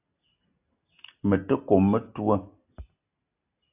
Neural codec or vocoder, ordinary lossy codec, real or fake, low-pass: none; AAC, 32 kbps; real; 3.6 kHz